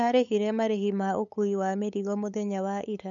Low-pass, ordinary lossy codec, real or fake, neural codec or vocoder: 7.2 kHz; none; fake; codec, 16 kHz, 8 kbps, FunCodec, trained on LibriTTS, 25 frames a second